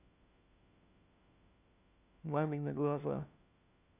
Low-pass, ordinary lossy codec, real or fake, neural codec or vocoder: 3.6 kHz; none; fake; codec, 16 kHz, 1 kbps, FunCodec, trained on LibriTTS, 50 frames a second